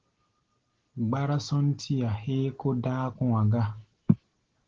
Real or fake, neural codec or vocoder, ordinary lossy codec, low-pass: real; none; Opus, 16 kbps; 7.2 kHz